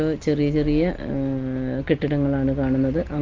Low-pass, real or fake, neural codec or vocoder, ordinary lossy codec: 7.2 kHz; real; none; Opus, 16 kbps